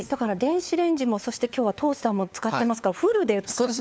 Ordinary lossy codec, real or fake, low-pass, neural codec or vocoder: none; fake; none; codec, 16 kHz, 4 kbps, FunCodec, trained on LibriTTS, 50 frames a second